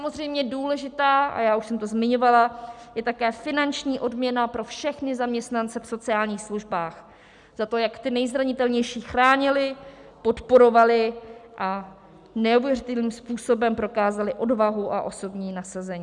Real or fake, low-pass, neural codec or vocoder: real; 10.8 kHz; none